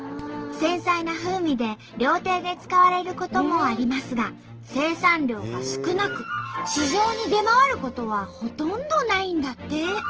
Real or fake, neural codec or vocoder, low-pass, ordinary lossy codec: real; none; 7.2 kHz; Opus, 16 kbps